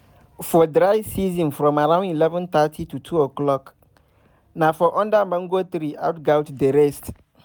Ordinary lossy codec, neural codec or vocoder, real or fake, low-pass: none; none; real; none